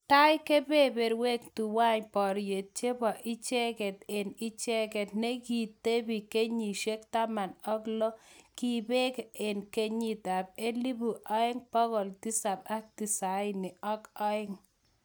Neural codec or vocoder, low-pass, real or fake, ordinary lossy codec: none; none; real; none